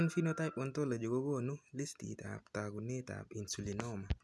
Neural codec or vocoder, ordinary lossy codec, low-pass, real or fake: none; none; none; real